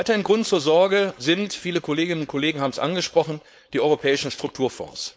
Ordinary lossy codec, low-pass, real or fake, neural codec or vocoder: none; none; fake; codec, 16 kHz, 4.8 kbps, FACodec